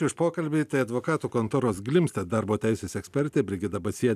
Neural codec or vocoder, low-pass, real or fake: none; 14.4 kHz; real